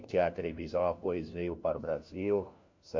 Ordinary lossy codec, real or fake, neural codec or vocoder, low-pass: MP3, 48 kbps; fake; codec, 16 kHz, 1 kbps, FunCodec, trained on LibriTTS, 50 frames a second; 7.2 kHz